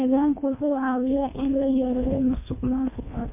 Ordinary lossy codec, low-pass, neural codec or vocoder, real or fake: MP3, 32 kbps; 3.6 kHz; codec, 24 kHz, 1.5 kbps, HILCodec; fake